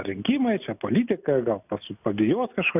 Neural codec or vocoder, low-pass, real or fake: none; 3.6 kHz; real